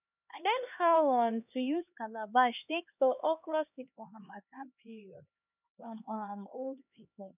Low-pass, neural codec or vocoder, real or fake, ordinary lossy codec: 3.6 kHz; codec, 16 kHz, 2 kbps, X-Codec, HuBERT features, trained on LibriSpeech; fake; none